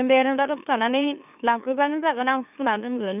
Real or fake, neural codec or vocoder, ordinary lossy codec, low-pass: fake; autoencoder, 44.1 kHz, a latent of 192 numbers a frame, MeloTTS; none; 3.6 kHz